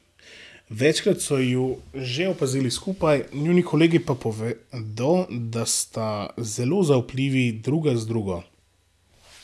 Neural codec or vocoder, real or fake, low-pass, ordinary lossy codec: none; real; none; none